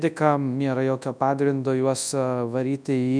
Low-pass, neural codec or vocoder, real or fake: 9.9 kHz; codec, 24 kHz, 0.9 kbps, WavTokenizer, large speech release; fake